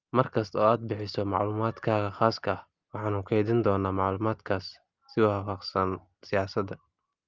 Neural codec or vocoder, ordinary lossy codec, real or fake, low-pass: none; Opus, 32 kbps; real; 7.2 kHz